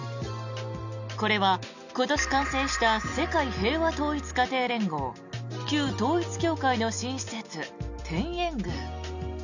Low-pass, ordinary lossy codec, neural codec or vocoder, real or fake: 7.2 kHz; none; none; real